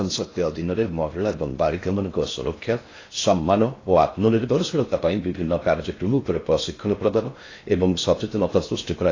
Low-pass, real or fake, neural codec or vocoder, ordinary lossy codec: 7.2 kHz; fake; codec, 16 kHz in and 24 kHz out, 0.6 kbps, FocalCodec, streaming, 4096 codes; AAC, 32 kbps